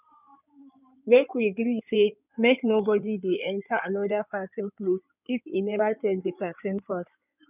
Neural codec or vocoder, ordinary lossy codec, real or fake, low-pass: codec, 16 kHz in and 24 kHz out, 2.2 kbps, FireRedTTS-2 codec; none; fake; 3.6 kHz